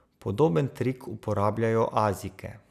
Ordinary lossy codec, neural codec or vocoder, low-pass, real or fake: none; none; 14.4 kHz; real